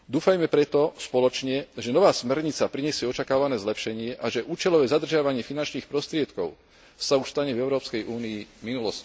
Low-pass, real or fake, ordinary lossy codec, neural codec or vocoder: none; real; none; none